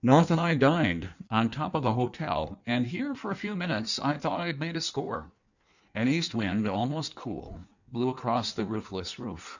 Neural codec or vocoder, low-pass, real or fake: codec, 16 kHz in and 24 kHz out, 1.1 kbps, FireRedTTS-2 codec; 7.2 kHz; fake